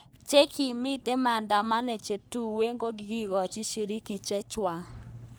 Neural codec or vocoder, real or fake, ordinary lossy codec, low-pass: codec, 44.1 kHz, 3.4 kbps, Pupu-Codec; fake; none; none